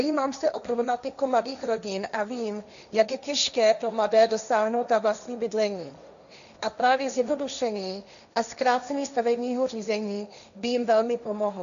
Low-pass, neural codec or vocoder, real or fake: 7.2 kHz; codec, 16 kHz, 1.1 kbps, Voila-Tokenizer; fake